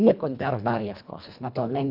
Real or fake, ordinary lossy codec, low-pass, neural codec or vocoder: fake; none; 5.4 kHz; codec, 24 kHz, 1.5 kbps, HILCodec